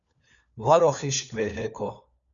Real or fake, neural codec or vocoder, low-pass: fake; codec, 16 kHz, 4 kbps, FunCodec, trained on LibriTTS, 50 frames a second; 7.2 kHz